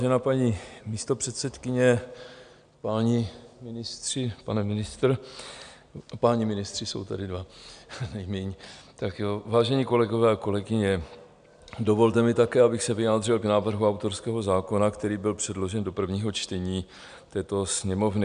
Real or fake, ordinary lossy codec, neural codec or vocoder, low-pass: real; MP3, 96 kbps; none; 9.9 kHz